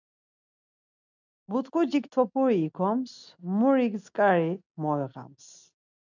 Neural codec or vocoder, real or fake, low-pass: none; real; 7.2 kHz